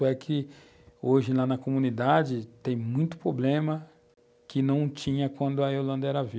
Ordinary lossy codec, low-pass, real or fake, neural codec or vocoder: none; none; real; none